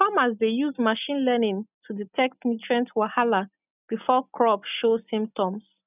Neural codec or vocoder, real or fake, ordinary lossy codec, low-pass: none; real; none; 3.6 kHz